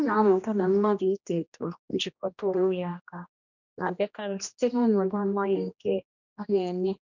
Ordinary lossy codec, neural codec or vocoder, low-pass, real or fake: none; codec, 16 kHz, 1 kbps, X-Codec, HuBERT features, trained on general audio; 7.2 kHz; fake